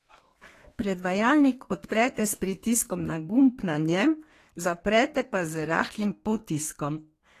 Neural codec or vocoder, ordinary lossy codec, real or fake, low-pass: codec, 32 kHz, 1.9 kbps, SNAC; AAC, 48 kbps; fake; 14.4 kHz